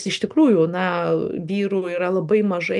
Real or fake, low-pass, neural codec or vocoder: real; 10.8 kHz; none